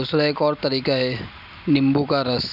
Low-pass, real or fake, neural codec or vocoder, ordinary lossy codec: 5.4 kHz; real; none; none